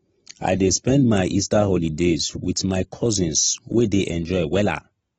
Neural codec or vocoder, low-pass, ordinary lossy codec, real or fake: none; 19.8 kHz; AAC, 24 kbps; real